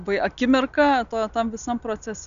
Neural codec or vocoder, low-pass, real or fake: none; 7.2 kHz; real